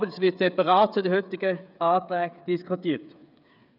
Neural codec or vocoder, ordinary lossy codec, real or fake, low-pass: codec, 16 kHz, 8 kbps, FreqCodec, smaller model; none; fake; 5.4 kHz